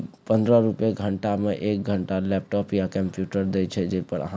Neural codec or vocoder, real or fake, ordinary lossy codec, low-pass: none; real; none; none